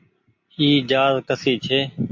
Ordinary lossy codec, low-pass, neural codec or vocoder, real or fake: MP3, 48 kbps; 7.2 kHz; none; real